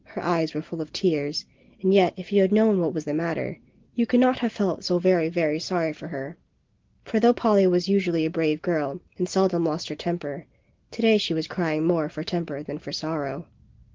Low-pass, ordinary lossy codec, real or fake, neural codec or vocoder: 7.2 kHz; Opus, 16 kbps; real; none